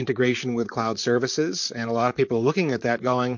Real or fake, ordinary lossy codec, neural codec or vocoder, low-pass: fake; MP3, 48 kbps; vocoder, 44.1 kHz, 128 mel bands, Pupu-Vocoder; 7.2 kHz